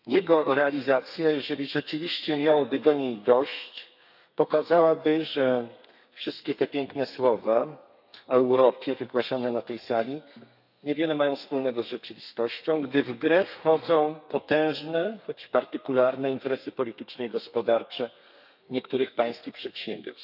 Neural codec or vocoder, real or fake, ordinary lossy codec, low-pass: codec, 32 kHz, 1.9 kbps, SNAC; fake; none; 5.4 kHz